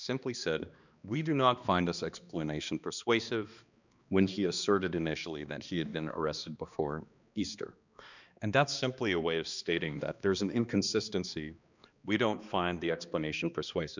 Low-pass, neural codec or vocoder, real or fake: 7.2 kHz; codec, 16 kHz, 2 kbps, X-Codec, HuBERT features, trained on balanced general audio; fake